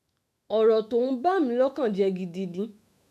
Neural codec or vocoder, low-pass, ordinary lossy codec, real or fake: autoencoder, 48 kHz, 128 numbers a frame, DAC-VAE, trained on Japanese speech; 14.4 kHz; none; fake